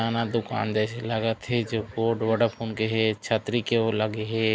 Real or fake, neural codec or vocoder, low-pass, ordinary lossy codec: real; none; none; none